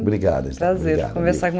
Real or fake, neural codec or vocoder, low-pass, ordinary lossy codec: real; none; none; none